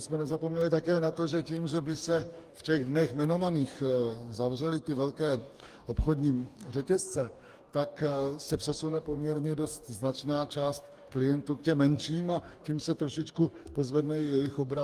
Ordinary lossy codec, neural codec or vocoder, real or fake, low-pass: Opus, 32 kbps; codec, 44.1 kHz, 2.6 kbps, DAC; fake; 14.4 kHz